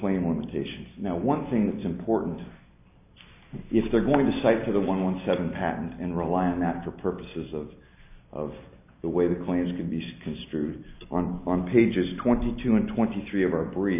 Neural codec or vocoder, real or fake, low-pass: none; real; 3.6 kHz